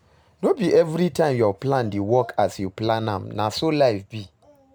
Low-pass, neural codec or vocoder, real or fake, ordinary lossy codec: none; none; real; none